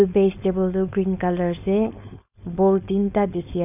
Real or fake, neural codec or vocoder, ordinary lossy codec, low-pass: fake; codec, 16 kHz, 4.8 kbps, FACodec; none; 3.6 kHz